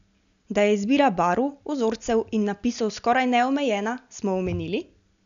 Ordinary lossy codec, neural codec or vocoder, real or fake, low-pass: none; none; real; 7.2 kHz